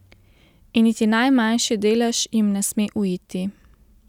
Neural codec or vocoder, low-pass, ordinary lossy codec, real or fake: none; 19.8 kHz; none; real